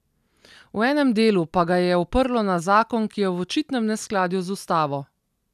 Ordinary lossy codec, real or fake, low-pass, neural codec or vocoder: none; real; 14.4 kHz; none